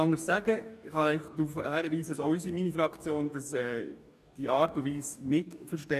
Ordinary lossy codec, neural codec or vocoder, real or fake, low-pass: none; codec, 44.1 kHz, 2.6 kbps, DAC; fake; 14.4 kHz